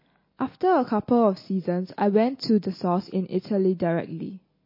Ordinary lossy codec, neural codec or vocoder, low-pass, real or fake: MP3, 24 kbps; none; 5.4 kHz; real